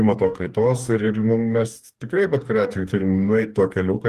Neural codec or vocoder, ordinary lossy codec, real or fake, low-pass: codec, 44.1 kHz, 2.6 kbps, DAC; Opus, 24 kbps; fake; 14.4 kHz